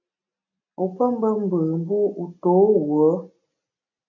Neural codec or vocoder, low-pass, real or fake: none; 7.2 kHz; real